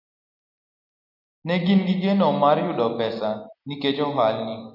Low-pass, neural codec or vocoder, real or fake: 5.4 kHz; none; real